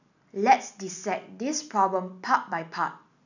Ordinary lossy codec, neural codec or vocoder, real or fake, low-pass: none; none; real; 7.2 kHz